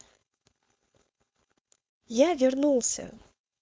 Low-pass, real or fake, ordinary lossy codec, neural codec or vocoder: none; fake; none; codec, 16 kHz, 4.8 kbps, FACodec